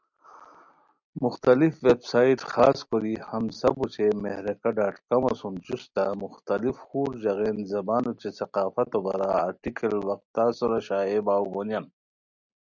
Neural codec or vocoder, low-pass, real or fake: none; 7.2 kHz; real